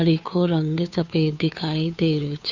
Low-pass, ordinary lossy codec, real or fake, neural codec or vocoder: 7.2 kHz; none; fake; codec, 16 kHz, 2 kbps, FunCodec, trained on Chinese and English, 25 frames a second